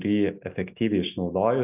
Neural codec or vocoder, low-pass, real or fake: none; 3.6 kHz; real